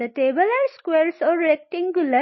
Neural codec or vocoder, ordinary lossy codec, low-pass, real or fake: none; MP3, 24 kbps; 7.2 kHz; real